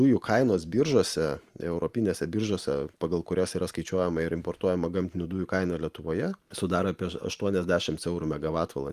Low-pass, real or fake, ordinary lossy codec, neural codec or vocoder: 14.4 kHz; fake; Opus, 32 kbps; vocoder, 44.1 kHz, 128 mel bands every 512 samples, BigVGAN v2